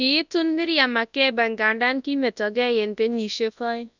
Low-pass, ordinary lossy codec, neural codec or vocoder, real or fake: 7.2 kHz; none; codec, 24 kHz, 0.9 kbps, WavTokenizer, large speech release; fake